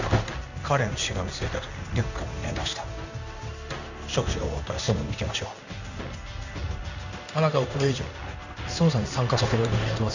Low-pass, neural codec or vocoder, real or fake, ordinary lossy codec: 7.2 kHz; codec, 16 kHz in and 24 kHz out, 1 kbps, XY-Tokenizer; fake; none